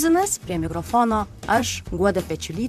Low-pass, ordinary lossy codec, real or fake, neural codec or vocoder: 14.4 kHz; MP3, 96 kbps; fake; vocoder, 44.1 kHz, 128 mel bands, Pupu-Vocoder